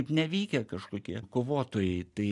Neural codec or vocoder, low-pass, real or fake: none; 10.8 kHz; real